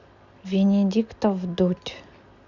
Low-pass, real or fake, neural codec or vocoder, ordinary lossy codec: 7.2 kHz; real; none; Opus, 64 kbps